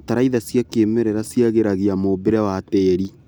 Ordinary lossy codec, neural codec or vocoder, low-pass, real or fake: none; none; none; real